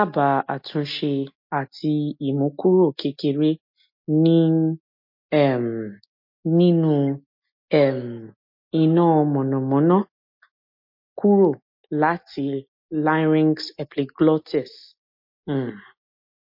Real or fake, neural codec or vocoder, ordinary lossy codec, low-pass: real; none; MP3, 32 kbps; 5.4 kHz